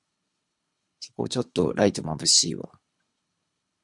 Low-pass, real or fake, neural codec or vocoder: 10.8 kHz; fake; codec, 24 kHz, 3 kbps, HILCodec